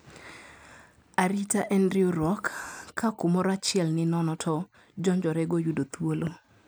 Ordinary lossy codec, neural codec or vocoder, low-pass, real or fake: none; none; none; real